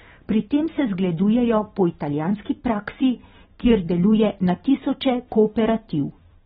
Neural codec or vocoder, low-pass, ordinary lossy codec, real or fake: none; 19.8 kHz; AAC, 16 kbps; real